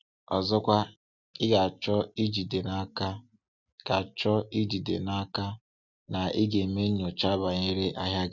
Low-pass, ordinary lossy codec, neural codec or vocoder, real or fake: 7.2 kHz; none; none; real